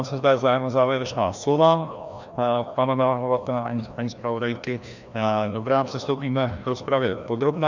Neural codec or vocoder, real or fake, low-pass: codec, 16 kHz, 1 kbps, FreqCodec, larger model; fake; 7.2 kHz